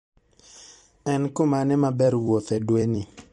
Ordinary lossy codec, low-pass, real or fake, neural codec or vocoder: MP3, 48 kbps; 19.8 kHz; fake; vocoder, 44.1 kHz, 128 mel bands every 256 samples, BigVGAN v2